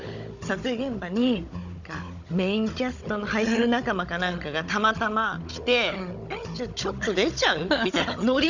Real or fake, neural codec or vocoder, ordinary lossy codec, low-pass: fake; codec, 16 kHz, 16 kbps, FunCodec, trained on Chinese and English, 50 frames a second; none; 7.2 kHz